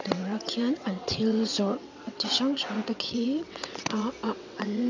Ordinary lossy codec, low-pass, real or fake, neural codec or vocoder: none; 7.2 kHz; fake; vocoder, 22.05 kHz, 80 mel bands, WaveNeXt